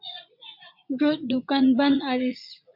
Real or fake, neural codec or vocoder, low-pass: fake; vocoder, 44.1 kHz, 80 mel bands, Vocos; 5.4 kHz